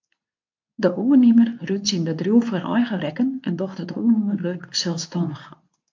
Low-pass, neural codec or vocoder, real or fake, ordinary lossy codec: 7.2 kHz; codec, 24 kHz, 0.9 kbps, WavTokenizer, medium speech release version 2; fake; AAC, 48 kbps